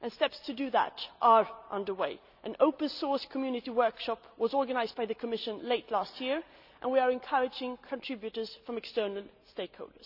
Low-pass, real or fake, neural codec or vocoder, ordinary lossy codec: 5.4 kHz; real; none; none